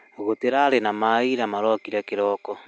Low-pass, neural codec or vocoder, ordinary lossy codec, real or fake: none; none; none; real